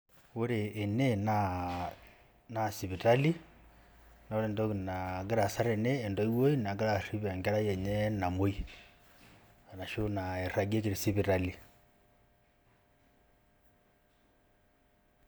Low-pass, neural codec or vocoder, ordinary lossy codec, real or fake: none; none; none; real